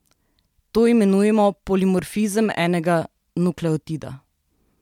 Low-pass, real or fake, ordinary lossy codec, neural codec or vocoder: 19.8 kHz; real; MP3, 96 kbps; none